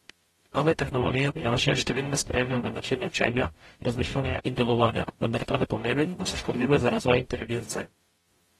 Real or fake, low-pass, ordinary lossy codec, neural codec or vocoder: fake; 19.8 kHz; AAC, 32 kbps; codec, 44.1 kHz, 0.9 kbps, DAC